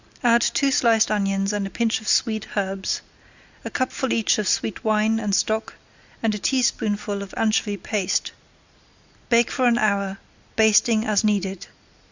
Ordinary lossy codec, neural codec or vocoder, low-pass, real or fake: Opus, 64 kbps; none; 7.2 kHz; real